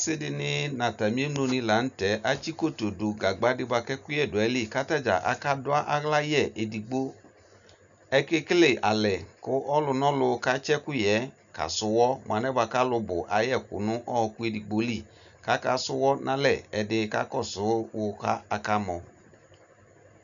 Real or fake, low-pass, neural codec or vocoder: real; 7.2 kHz; none